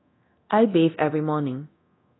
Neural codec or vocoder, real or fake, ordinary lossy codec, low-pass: codec, 24 kHz, 0.9 kbps, DualCodec; fake; AAC, 16 kbps; 7.2 kHz